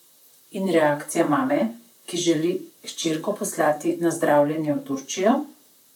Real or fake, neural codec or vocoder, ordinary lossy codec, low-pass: fake; vocoder, 44.1 kHz, 128 mel bands, Pupu-Vocoder; none; 19.8 kHz